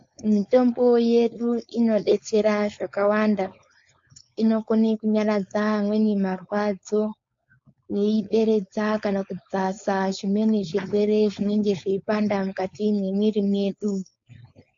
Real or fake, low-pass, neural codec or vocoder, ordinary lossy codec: fake; 7.2 kHz; codec, 16 kHz, 4.8 kbps, FACodec; MP3, 48 kbps